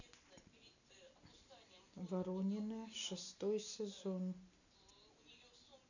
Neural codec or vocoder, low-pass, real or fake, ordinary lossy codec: none; 7.2 kHz; real; none